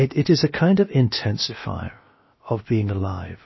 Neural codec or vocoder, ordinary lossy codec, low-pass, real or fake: codec, 16 kHz, about 1 kbps, DyCAST, with the encoder's durations; MP3, 24 kbps; 7.2 kHz; fake